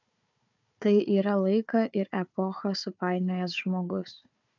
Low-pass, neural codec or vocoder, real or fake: 7.2 kHz; codec, 16 kHz, 4 kbps, FunCodec, trained on Chinese and English, 50 frames a second; fake